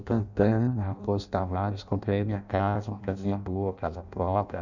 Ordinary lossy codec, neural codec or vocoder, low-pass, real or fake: none; codec, 16 kHz in and 24 kHz out, 0.6 kbps, FireRedTTS-2 codec; 7.2 kHz; fake